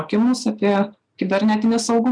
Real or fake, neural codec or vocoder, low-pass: real; none; 9.9 kHz